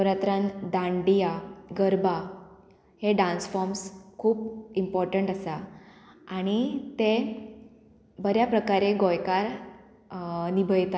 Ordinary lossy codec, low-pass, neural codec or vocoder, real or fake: none; none; none; real